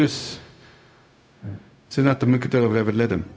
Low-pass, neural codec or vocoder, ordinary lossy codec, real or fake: none; codec, 16 kHz, 0.4 kbps, LongCat-Audio-Codec; none; fake